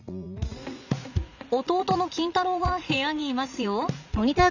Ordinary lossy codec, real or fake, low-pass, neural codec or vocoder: none; fake; 7.2 kHz; vocoder, 44.1 kHz, 80 mel bands, Vocos